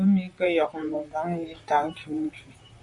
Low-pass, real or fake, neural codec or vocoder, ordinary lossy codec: 10.8 kHz; fake; vocoder, 44.1 kHz, 128 mel bands, Pupu-Vocoder; AAC, 64 kbps